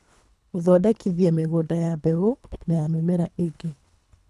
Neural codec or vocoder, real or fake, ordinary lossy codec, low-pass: codec, 24 kHz, 3 kbps, HILCodec; fake; none; none